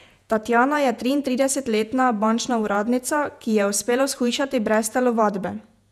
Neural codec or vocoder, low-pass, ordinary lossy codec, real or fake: vocoder, 48 kHz, 128 mel bands, Vocos; 14.4 kHz; none; fake